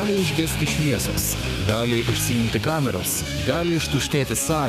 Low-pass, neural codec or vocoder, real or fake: 14.4 kHz; codec, 32 kHz, 1.9 kbps, SNAC; fake